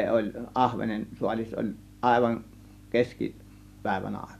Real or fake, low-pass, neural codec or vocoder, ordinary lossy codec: real; 14.4 kHz; none; none